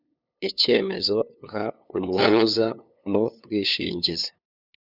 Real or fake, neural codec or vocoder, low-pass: fake; codec, 16 kHz, 2 kbps, FunCodec, trained on LibriTTS, 25 frames a second; 5.4 kHz